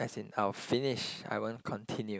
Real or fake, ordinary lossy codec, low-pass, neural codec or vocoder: real; none; none; none